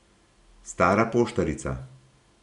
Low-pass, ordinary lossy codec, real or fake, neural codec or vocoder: 10.8 kHz; none; real; none